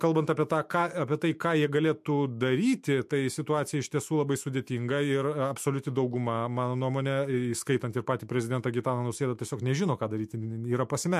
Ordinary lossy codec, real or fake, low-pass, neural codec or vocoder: MP3, 64 kbps; fake; 14.4 kHz; autoencoder, 48 kHz, 128 numbers a frame, DAC-VAE, trained on Japanese speech